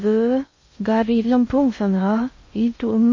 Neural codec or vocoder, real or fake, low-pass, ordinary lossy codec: codec, 16 kHz in and 24 kHz out, 0.6 kbps, FocalCodec, streaming, 2048 codes; fake; 7.2 kHz; MP3, 32 kbps